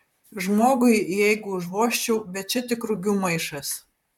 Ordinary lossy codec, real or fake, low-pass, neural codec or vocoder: MP3, 96 kbps; fake; 19.8 kHz; vocoder, 44.1 kHz, 128 mel bands, Pupu-Vocoder